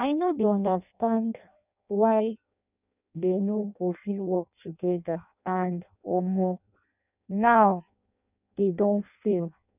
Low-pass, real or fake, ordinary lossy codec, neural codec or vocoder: 3.6 kHz; fake; none; codec, 16 kHz in and 24 kHz out, 0.6 kbps, FireRedTTS-2 codec